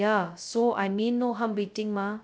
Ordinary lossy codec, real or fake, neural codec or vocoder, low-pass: none; fake; codec, 16 kHz, 0.2 kbps, FocalCodec; none